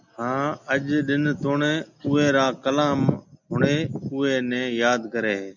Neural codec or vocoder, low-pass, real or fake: none; 7.2 kHz; real